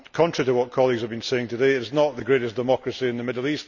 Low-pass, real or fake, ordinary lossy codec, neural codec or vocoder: 7.2 kHz; real; none; none